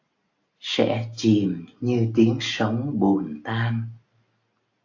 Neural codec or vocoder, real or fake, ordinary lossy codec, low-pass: none; real; AAC, 48 kbps; 7.2 kHz